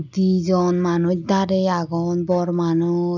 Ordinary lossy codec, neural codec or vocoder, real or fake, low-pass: none; none; real; 7.2 kHz